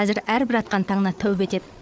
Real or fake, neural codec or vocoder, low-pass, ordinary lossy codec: fake; codec, 16 kHz, 8 kbps, FreqCodec, larger model; none; none